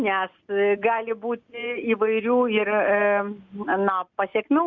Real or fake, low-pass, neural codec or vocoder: real; 7.2 kHz; none